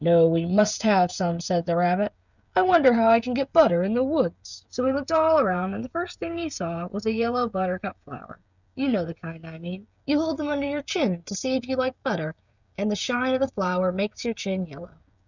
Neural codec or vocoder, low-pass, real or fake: codec, 16 kHz, 16 kbps, FreqCodec, smaller model; 7.2 kHz; fake